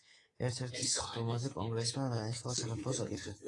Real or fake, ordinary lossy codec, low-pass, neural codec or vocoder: fake; AAC, 32 kbps; 10.8 kHz; codec, 24 kHz, 3.1 kbps, DualCodec